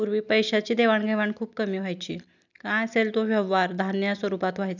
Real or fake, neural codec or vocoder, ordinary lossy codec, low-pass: real; none; none; 7.2 kHz